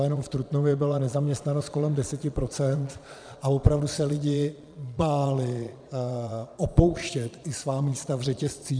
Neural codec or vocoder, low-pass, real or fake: vocoder, 22.05 kHz, 80 mel bands, Vocos; 9.9 kHz; fake